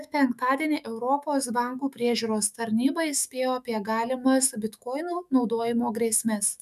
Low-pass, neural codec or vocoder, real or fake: 14.4 kHz; autoencoder, 48 kHz, 128 numbers a frame, DAC-VAE, trained on Japanese speech; fake